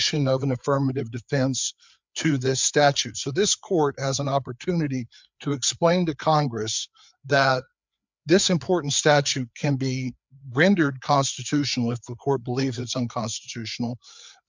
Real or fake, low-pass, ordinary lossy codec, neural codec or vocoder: fake; 7.2 kHz; MP3, 64 kbps; codec, 16 kHz, 4 kbps, FreqCodec, larger model